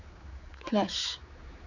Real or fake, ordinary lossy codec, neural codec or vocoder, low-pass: fake; none; codec, 16 kHz, 4 kbps, X-Codec, HuBERT features, trained on general audio; 7.2 kHz